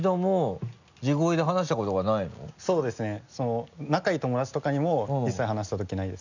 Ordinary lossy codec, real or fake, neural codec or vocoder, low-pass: none; real; none; 7.2 kHz